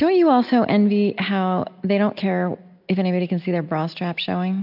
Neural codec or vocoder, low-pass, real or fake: none; 5.4 kHz; real